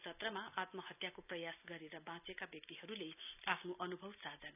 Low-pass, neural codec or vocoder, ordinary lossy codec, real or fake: 3.6 kHz; none; none; real